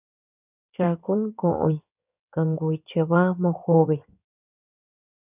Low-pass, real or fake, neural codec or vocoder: 3.6 kHz; fake; codec, 16 kHz in and 24 kHz out, 2.2 kbps, FireRedTTS-2 codec